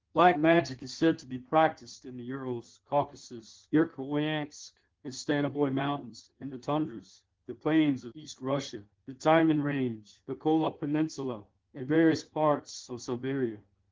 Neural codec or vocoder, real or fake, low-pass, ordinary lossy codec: codec, 16 kHz in and 24 kHz out, 1.1 kbps, FireRedTTS-2 codec; fake; 7.2 kHz; Opus, 16 kbps